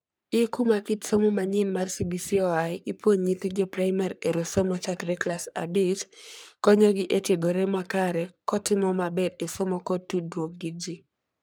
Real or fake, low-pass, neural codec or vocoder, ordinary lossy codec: fake; none; codec, 44.1 kHz, 3.4 kbps, Pupu-Codec; none